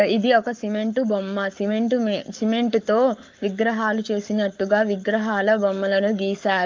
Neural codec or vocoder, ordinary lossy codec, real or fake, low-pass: codec, 44.1 kHz, 7.8 kbps, Pupu-Codec; Opus, 32 kbps; fake; 7.2 kHz